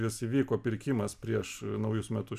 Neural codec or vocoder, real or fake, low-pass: none; real; 14.4 kHz